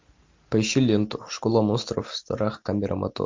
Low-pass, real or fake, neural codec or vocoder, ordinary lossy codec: 7.2 kHz; real; none; MP3, 48 kbps